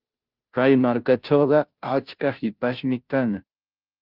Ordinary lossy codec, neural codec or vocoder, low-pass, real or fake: Opus, 24 kbps; codec, 16 kHz, 0.5 kbps, FunCodec, trained on Chinese and English, 25 frames a second; 5.4 kHz; fake